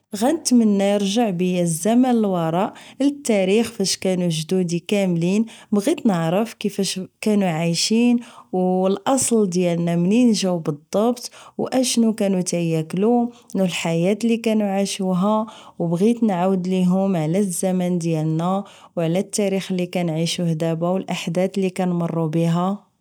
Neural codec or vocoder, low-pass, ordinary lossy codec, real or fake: none; none; none; real